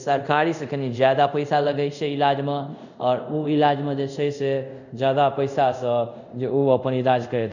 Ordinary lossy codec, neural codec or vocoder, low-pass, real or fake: none; codec, 24 kHz, 0.5 kbps, DualCodec; 7.2 kHz; fake